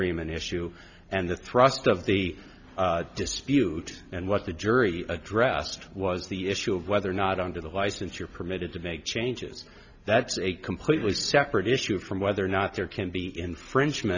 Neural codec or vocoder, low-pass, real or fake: none; 7.2 kHz; real